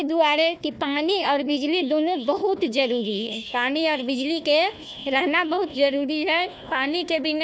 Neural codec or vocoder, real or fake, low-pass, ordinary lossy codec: codec, 16 kHz, 1 kbps, FunCodec, trained on Chinese and English, 50 frames a second; fake; none; none